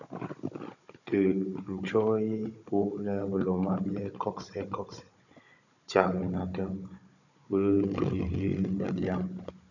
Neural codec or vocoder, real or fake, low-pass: codec, 16 kHz, 16 kbps, FunCodec, trained on Chinese and English, 50 frames a second; fake; 7.2 kHz